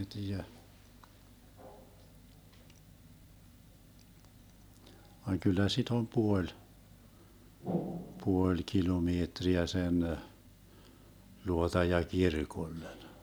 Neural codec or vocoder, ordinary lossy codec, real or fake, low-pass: none; none; real; none